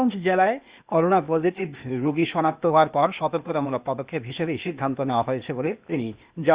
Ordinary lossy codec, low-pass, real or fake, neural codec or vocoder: Opus, 64 kbps; 3.6 kHz; fake; codec, 16 kHz, 0.8 kbps, ZipCodec